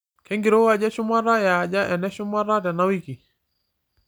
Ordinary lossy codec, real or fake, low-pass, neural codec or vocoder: none; real; none; none